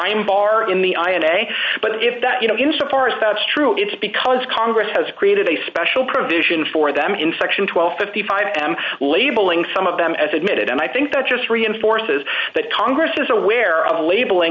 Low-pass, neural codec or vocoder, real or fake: 7.2 kHz; none; real